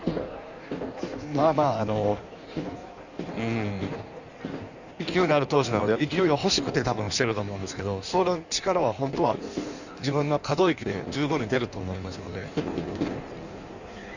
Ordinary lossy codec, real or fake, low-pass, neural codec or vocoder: none; fake; 7.2 kHz; codec, 16 kHz in and 24 kHz out, 1.1 kbps, FireRedTTS-2 codec